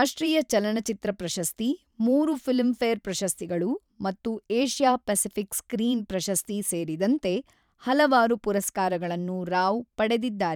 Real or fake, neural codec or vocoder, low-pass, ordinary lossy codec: fake; vocoder, 44.1 kHz, 128 mel bands every 512 samples, BigVGAN v2; 19.8 kHz; none